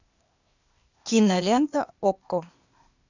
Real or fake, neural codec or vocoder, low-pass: fake; codec, 16 kHz, 0.8 kbps, ZipCodec; 7.2 kHz